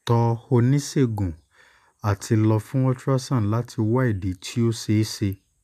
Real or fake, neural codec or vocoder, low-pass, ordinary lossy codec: real; none; 14.4 kHz; none